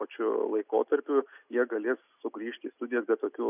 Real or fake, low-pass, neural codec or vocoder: real; 3.6 kHz; none